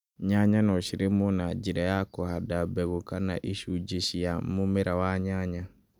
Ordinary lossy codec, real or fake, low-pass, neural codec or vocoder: none; real; 19.8 kHz; none